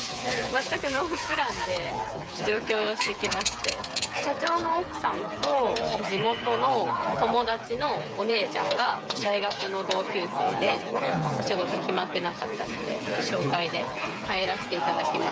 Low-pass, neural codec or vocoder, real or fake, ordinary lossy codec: none; codec, 16 kHz, 8 kbps, FreqCodec, smaller model; fake; none